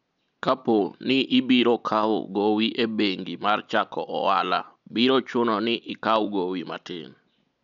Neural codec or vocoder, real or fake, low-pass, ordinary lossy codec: none; real; 7.2 kHz; none